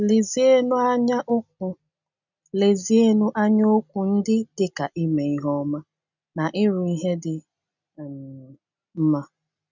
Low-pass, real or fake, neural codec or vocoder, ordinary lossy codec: 7.2 kHz; real; none; none